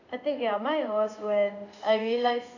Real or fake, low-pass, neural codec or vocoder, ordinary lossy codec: fake; 7.2 kHz; codec, 16 kHz in and 24 kHz out, 1 kbps, XY-Tokenizer; none